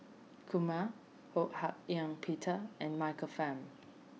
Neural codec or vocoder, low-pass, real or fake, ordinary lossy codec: none; none; real; none